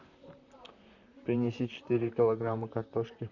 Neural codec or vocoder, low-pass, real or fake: vocoder, 44.1 kHz, 128 mel bands, Pupu-Vocoder; 7.2 kHz; fake